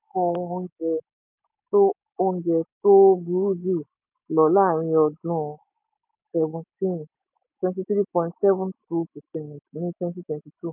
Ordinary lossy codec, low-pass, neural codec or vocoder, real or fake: none; 3.6 kHz; none; real